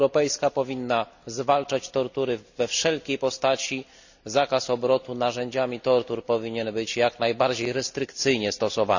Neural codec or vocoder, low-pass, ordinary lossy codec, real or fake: none; 7.2 kHz; none; real